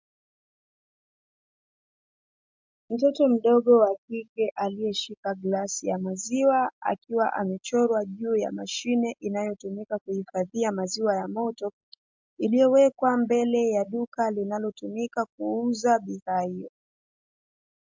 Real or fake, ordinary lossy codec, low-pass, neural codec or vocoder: real; MP3, 64 kbps; 7.2 kHz; none